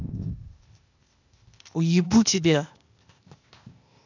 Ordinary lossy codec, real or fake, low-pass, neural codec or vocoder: none; fake; 7.2 kHz; codec, 16 kHz, 0.8 kbps, ZipCodec